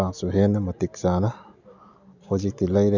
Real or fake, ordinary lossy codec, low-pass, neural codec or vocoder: real; none; 7.2 kHz; none